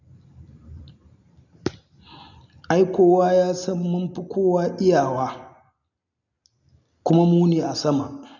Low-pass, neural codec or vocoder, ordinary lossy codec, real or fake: 7.2 kHz; none; none; real